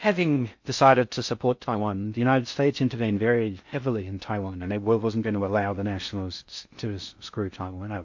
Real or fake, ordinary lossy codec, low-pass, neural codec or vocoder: fake; MP3, 48 kbps; 7.2 kHz; codec, 16 kHz in and 24 kHz out, 0.6 kbps, FocalCodec, streaming, 4096 codes